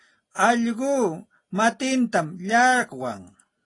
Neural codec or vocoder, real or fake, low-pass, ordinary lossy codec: none; real; 10.8 kHz; AAC, 32 kbps